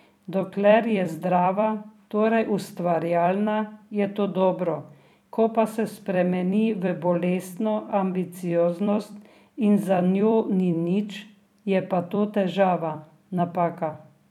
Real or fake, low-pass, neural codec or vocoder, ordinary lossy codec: fake; 19.8 kHz; vocoder, 44.1 kHz, 128 mel bands every 256 samples, BigVGAN v2; none